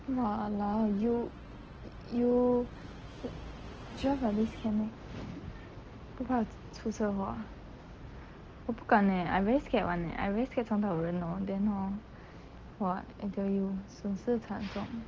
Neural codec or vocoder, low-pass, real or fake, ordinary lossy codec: none; 7.2 kHz; real; Opus, 16 kbps